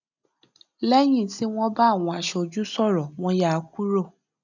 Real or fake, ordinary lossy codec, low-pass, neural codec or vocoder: real; none; 7.2 kHz; none